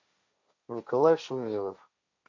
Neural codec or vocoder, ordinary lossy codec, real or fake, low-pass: codec, 16 kHz, 1.1 kbps, Voila-Tokenizer; MP3, 48 kbps; fake; 7.2 kHz